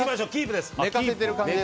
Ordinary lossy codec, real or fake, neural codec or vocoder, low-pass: none; real; none; none